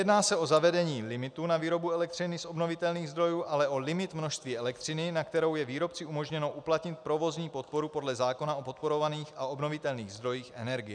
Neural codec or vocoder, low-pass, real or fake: none; 9.9 kHz; real